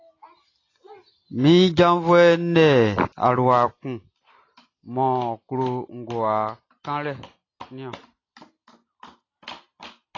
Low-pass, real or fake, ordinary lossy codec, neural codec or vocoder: 7.2 kHz; real; AAC, 32 kbps; none